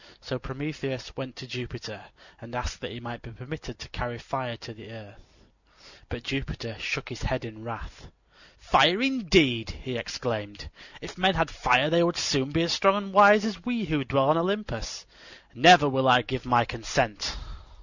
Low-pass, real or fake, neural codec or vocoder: 7.2 kHz; real; none